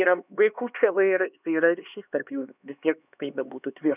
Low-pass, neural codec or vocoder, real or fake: 3.6 kHz; codec, 16 kHz, 2 kbps, X-Codec, HuBERT features, trained on LibriSpeech; fake